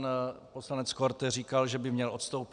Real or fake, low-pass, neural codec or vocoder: real; 9.9 kHz; none